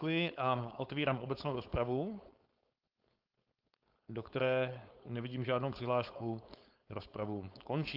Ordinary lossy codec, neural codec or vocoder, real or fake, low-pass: Opus, 32 kbps; codec, 16 kHz, 4.8 kbps, FACodec; fake; 5.4 kHz